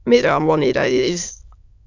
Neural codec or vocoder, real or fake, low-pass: autoencoder, 22.05 kHz, a latent of 192 numbers a frame, VITS, trained on many speakers; fake; 7.2 kHz